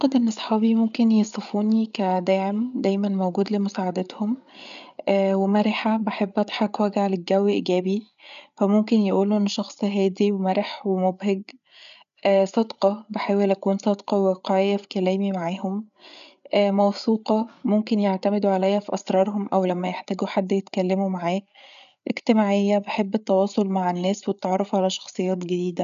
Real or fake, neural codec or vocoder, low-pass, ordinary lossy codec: fake; codec, 16 kHz, 8 kbps, FreqCodec, larger model; 7.2 kHz; none